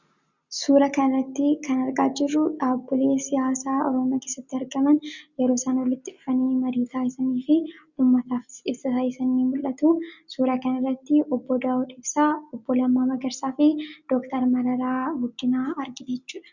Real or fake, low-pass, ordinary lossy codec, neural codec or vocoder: real; 7.2 kHz; Opus, 64 kbps; none